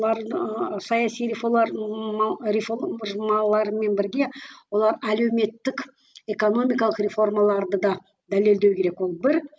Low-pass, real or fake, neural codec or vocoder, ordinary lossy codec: none; real; none; none